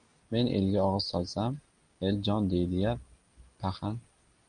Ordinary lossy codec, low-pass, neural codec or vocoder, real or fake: Opus, 32 kbps; 9.9 kHz; none; real